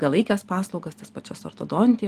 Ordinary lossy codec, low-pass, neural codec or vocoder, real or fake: Opus, 32 kbps; 14.4 kHz; none; real